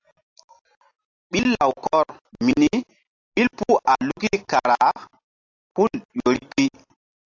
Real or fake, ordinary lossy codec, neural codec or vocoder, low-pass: real; AAC, 48 kbps; none; 7.2 kHz